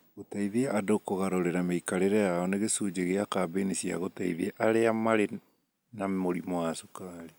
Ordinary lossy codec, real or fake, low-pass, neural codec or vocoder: none; real; none; none